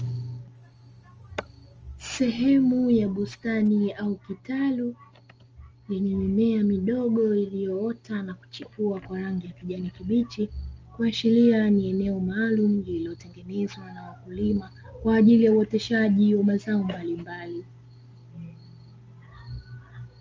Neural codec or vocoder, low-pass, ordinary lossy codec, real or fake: none; 7.2 kHz; Opus, 24 kbps; real